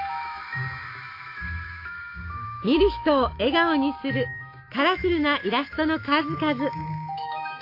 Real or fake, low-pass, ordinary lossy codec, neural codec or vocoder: fake; 5.4 kHz; AAC, 32 kbps; autoencoder, 48 kHz, 128 numbers a frame, DAC-VAE, trained on Japanese speech